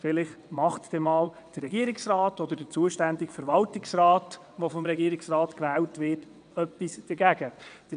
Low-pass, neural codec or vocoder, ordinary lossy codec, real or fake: 9.9 kHz; vocoder, 22.05 kHz, 80 mel bands, WaveNeXt; none; fake